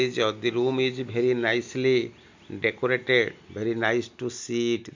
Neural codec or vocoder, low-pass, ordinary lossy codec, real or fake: none; 7.2 kHz; MP3, 64 kbps; real